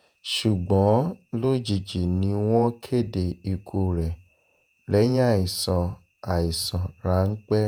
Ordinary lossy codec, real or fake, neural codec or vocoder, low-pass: none; fake; vocoder, 48 kHz, 128 mel bands, Vocos; none